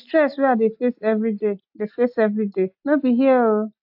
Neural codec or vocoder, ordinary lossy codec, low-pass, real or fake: none; AAC, 48 kbps; 5.4 kHz; real